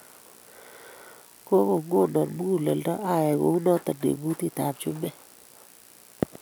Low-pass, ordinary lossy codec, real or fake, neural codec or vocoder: none; none; real; none